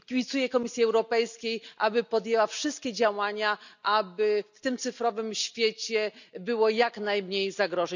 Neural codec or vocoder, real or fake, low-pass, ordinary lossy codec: none; real; 7.2 kHz; none